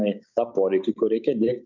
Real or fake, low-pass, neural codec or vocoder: fake; 7.2 kHz; codec, 16 kHz, 6 kbps, DAC